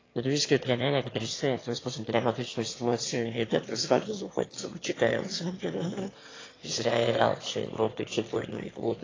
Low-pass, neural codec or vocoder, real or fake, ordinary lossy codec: 7.2 kHz; autoencoder, 22.05 kHz, a latent of 192 numbers a frame, VITS, trained on one speaker; fake; AAC, 32 kbps